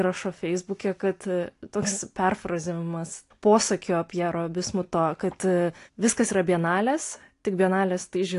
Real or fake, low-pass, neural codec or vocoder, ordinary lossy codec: real; 10.8 kHz; none; AAC, 48 kbps